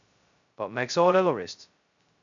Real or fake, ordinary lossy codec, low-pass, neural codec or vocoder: fake; MP3, 64 kbps; 7.2 kHz; codec, 16 kHz, 0.2 kbps, FocalCodec